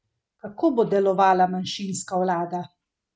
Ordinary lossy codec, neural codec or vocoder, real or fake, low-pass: none; none; real; none